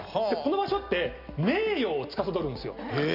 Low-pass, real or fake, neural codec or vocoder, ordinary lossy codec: 5.4 kHz; real; none; none